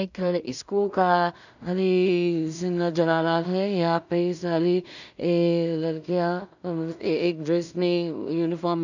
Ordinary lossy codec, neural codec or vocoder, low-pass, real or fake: none; codec, 16 kHz in and 24 kHz out, 0.4 kbps, LongCat-Audio-Codec, two codebook decoder; 7.2 kHz; fake